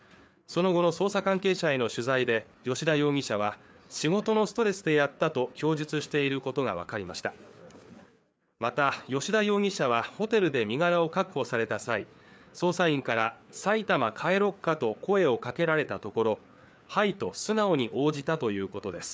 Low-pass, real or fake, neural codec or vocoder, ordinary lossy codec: none; fake; codec, 16 kHz, 4 kbps, FreqCodec, larger model; none